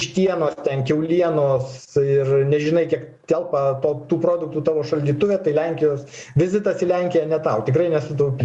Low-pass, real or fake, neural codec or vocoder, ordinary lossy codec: 10.8 kHz; real; none; Opus, 64 kbps